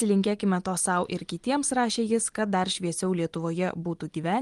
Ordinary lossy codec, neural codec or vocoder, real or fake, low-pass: Opus, 24 kbps; none; real; 10.8 kHz